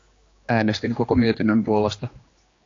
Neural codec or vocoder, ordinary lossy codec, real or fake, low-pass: codec, 16 kHz, 2 kbps, X-Codec, HuBERT features, trained on general audio; AAC, 32 kbps; fake; 7.2 kHz